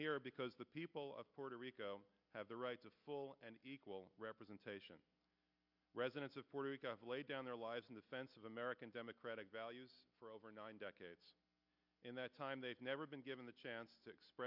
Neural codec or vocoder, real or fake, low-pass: none; real; 5.4 kHz